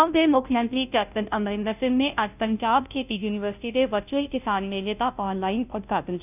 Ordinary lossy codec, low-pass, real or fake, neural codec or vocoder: none; 3.6 kHz; fake; codec, 16 kHz, 0.5 kbps, FunCodec, trained on Chinese and English, 25 frames a second